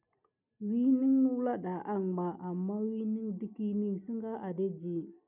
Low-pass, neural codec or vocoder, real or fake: 3.6 kHz; none; real